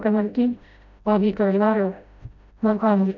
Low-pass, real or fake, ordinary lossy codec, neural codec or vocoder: 7.2 kHz; fake; none; codec, 16 kHz, 0.5 kbps, FreqCodec, smaller model